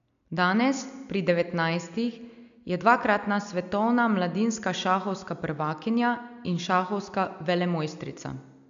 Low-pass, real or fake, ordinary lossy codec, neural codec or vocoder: 7.2 kHz; real; none; none